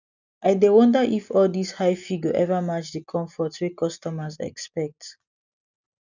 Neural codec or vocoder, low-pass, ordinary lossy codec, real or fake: none; 7.2 kHz; none; real